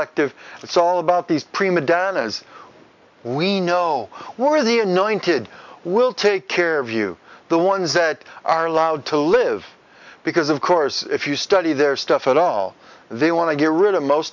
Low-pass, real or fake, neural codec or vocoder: 7.2 kHz; real; none